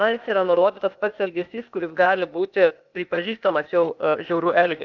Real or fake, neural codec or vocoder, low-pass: fake; codec, 16 kHz, 0.8 kbps, ZipCodec; 7.2 kHz